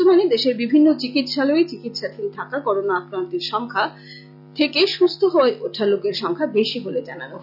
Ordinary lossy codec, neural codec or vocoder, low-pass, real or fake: none; none; 5.4 kHz; real